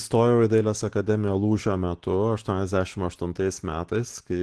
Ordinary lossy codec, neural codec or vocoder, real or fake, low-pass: Opus, 16 kbps; none; real; 10.8 kHz